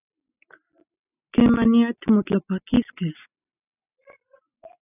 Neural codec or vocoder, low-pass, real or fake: none; 3.6 kHz; real